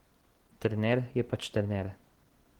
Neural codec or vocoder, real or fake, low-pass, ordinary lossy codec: none; real; 19.8 kHz; Opus, 16 kbps